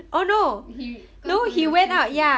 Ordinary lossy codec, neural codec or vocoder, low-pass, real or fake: none; none; none; real